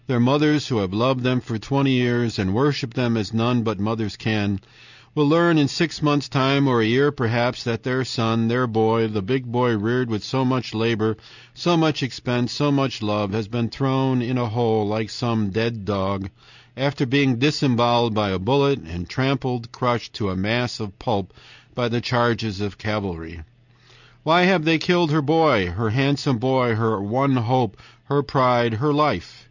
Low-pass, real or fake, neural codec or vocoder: 7.2 kHz; real; none